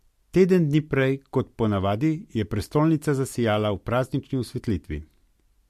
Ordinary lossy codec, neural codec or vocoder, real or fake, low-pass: MP3, 64 kbps; none; real; 14.4 kHz